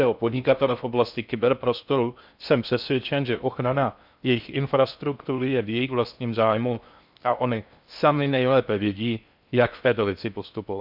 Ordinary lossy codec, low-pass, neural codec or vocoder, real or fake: none; 5.4 kHz; codec, 16 kHz in and 24 kHz out, 0.6 kbps, FocalCodec, streaming, 4096 codes; fake